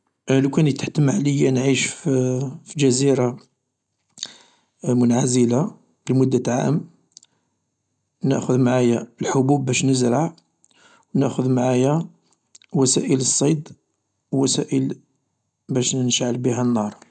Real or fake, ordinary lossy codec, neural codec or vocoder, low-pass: real; none; none; 10.8 kHz